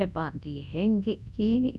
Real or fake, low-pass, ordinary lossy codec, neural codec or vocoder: fake; none; none; codec, 24 kHz, 0.9 kbps, WavTokenizer, large speech release